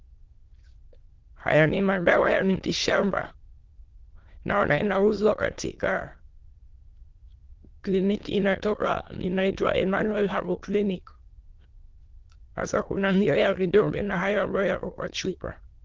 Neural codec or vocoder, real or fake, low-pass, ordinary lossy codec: autoencoder, 22.05 kHz, a latent of 192 numbers a frame, VITS, trained on many speakers; fake; 7.2 kHz; Opus, 16 kbps